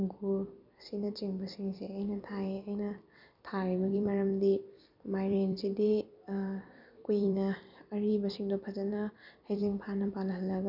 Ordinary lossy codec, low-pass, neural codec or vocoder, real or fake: none; 5.4 kHz; vocoder, 44.1 kHz, 128 mel bands every 256 samples, BigVGAN v2; fake